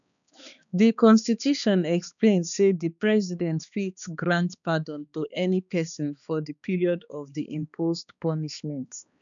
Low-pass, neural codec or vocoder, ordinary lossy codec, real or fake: 7.2 kHz; codec, 16 kHz, 2 kbps, X-Codec, HuBERT features, trained on balanced general audio; none; fake